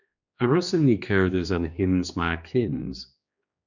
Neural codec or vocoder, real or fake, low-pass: codec, 16 kHz, 2 kbps, X-Codec, HuBERT features, trained on general audio; fake; 7.2 kHz